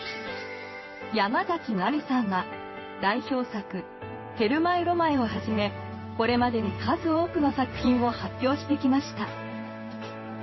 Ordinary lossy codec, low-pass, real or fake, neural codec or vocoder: MP3, 24 kbps; 7.2 kHz; fake; codec, 16 kHz in and 24 kHz out, 1 kbps, XY-Tokenizer